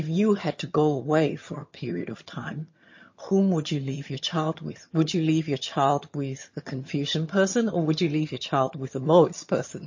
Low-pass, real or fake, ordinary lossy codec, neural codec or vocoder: 7.2 kHz; fake; MP3, 32 kbps; vocoder, 22.05 kHz, 80 mel bands, HiFi-GAN